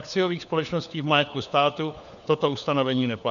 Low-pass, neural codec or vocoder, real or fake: 7.2 kHz; codec, 16 kHz, 4 kbps, FunCodec, trained on LibriTTS, 50 frames a second; fake